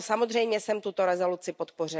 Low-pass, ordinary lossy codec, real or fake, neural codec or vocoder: none; none; real; none